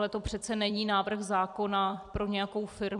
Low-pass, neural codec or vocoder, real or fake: 10.8 kHz; vocoder, 44.1 kHz, 128 mel bands every 256 samples, BigVGAN v2; fake